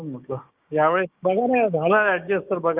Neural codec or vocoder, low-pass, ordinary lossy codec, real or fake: codec, 16 kHz, 6 kbps, DAC; 3.6 kHz; Opus, 24 kbps; fake